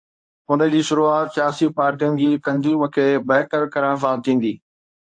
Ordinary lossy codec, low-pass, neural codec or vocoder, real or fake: AAC, 64 kbps; 9.9 kHz; codec, 24 kHz, 0.9 kbps, WavTokenizer, medium speech release version 1; fake